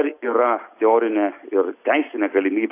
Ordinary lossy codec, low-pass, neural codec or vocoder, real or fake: AAC, 24 kbps; 3.6 kHz; none; real